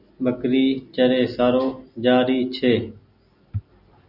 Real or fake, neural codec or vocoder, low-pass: real; none; 5.4 kHz